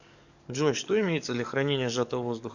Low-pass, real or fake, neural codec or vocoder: 7.2 kHz; fake; codec, 44.1 kHz, 7.8 kbps, DAC